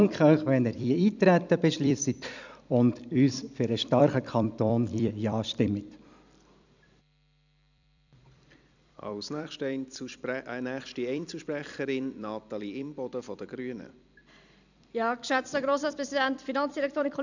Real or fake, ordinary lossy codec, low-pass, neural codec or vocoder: fake; none; 7.2 kHz; vocoder, 44.1 kHz, 128 mel bands every 256 samples, BigVGAN v2